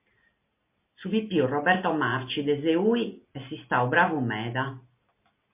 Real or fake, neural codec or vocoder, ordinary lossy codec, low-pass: real; none; MP3, 24 kbps; 3.6 kHz